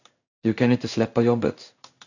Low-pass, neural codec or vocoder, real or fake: 7.2 kHz; codec, 16 kHz in and 24 kHz out, 1 kbps, XY-Tokenizer; fake